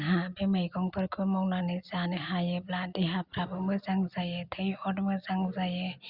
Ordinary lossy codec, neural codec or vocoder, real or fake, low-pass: Opus, 64 kbps; none; real; 5.4 kHz